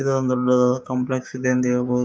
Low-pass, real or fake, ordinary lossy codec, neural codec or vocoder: none; fake; none; codec, 16 kHz, 6 kbps, DAC